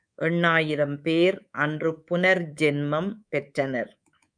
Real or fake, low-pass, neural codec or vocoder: fake; 9.9 kHz; codec, 24 kHz, 3.1 kbps, DualCodec